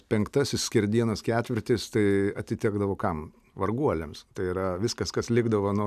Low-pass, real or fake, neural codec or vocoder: 14.4 kHz; real; none